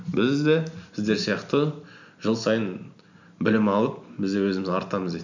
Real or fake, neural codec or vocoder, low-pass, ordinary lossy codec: real; none; 7.2 kHz; none